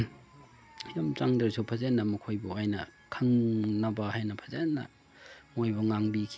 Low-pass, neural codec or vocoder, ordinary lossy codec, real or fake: none; none; none; real